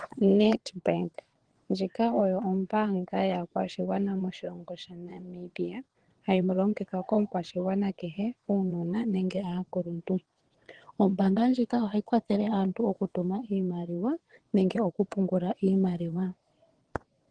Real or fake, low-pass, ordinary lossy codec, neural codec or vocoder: fake; 9.9 kHz; Opus, 16 kbps; vocoder, 22.05 kHz, 80 mel bands, WaveNeXt